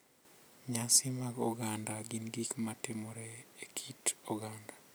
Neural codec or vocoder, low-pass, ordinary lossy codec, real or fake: none; none; none; real